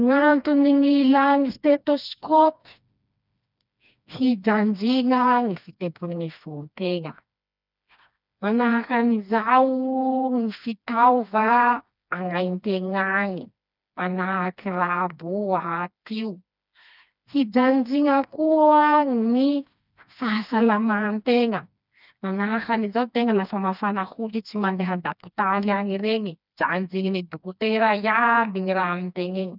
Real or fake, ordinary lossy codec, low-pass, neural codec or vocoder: fake; none; 5.4 kHz; codec, 16 kHz, 2 kbps, FreqCodec, smaller model